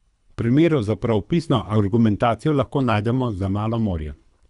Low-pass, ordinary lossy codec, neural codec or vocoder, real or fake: 10.8 kHz; none; codec, 24 kHz, 3 kbps, HILCodec; fake